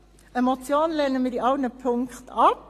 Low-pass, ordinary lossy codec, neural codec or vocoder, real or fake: 14.4 kHz; AAC, 48 kbps; none; real